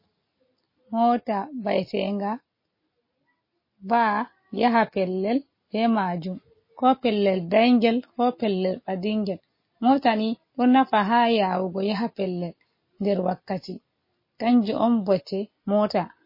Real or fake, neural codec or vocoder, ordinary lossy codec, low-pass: real; none; MP3, 24 kbps; 5.4 kHz